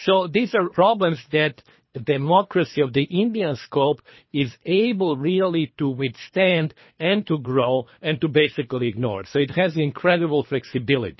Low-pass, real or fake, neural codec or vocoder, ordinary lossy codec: 7.2 kHz; fake; codec, 24 kHz, 3 kbps, HILCodec; MP3, 24 kbps